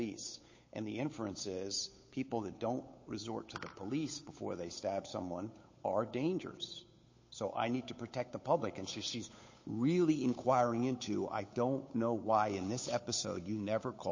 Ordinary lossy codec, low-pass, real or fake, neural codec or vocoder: MP3, 32 kbps; 7.2 kHz; fake; codec, 16 kHz, 8 kbps, FunCodec, trained on Chinese and English, 25 frames a second